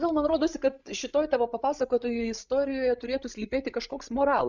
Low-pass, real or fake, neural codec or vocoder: 7.2 kHz; fake; codec, 16 kHz, 16 kbps, FreqCodec, larger model